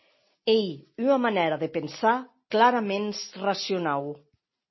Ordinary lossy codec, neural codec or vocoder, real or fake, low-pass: MP3, 24 kbps; none; real; 7.2 kHz